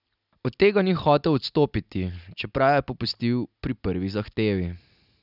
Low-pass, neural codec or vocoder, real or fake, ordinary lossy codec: 5.4 kHz; none; real; none